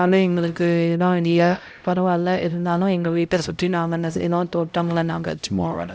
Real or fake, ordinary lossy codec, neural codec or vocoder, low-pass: fake; none; codec, 16 kHz, 0.5 kbps, X-Codec, HuBERT features, trained on LibriSpeech; none